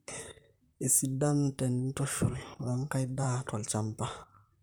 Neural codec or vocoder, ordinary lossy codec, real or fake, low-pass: vocoder, 44.1 kHz, 128 mel bands, Pupu-Vocoder; none; fake; none